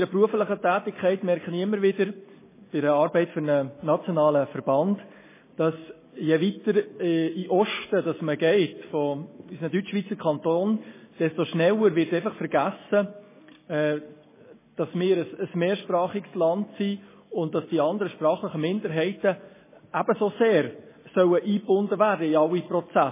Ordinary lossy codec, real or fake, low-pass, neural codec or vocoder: MP3, 16 kbps; real; 3.6 kHz; none